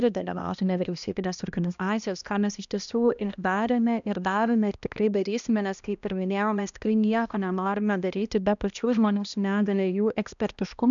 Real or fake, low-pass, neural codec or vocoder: fake; 7.2 kHz; codec, 16 kHz, 1 kbps, X-Codec, HuBERT features, trained on balanced general audio